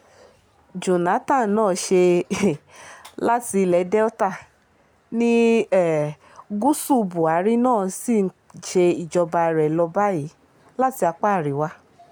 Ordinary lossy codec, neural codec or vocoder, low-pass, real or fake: none; none; none; real